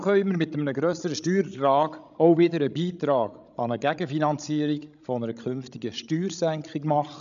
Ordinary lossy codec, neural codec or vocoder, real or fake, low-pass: none; codec, 16 kHz, 16 kbps, FreqCodec, larger model; fake; 7.2 kHz